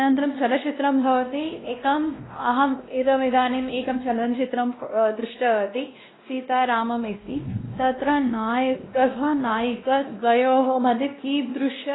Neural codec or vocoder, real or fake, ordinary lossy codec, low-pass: codec, 16 kHz, 1 kbps, X-Codec, WavLM features, trained on Multilingual LibriSpeech; fake; AAC, 16 kbps; 7.2 kHz